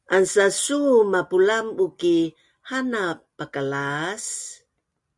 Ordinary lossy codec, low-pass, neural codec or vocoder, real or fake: Opus, 64 kbps; 10.8 kHz; none; real